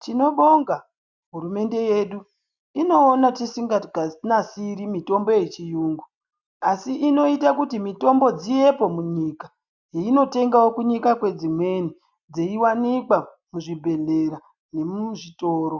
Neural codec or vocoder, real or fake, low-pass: none; real; 7.2 kHz